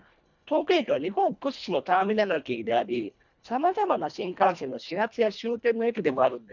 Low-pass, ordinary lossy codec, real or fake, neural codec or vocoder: 7.2 kHz; none; fake; codec, 24 kHz, 1.5 kbps, HILCodec